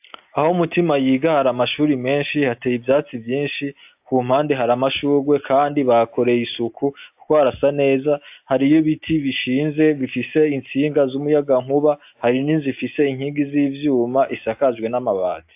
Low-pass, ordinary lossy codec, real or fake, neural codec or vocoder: 3.6 kHz; AAC, 32 kbps; real; none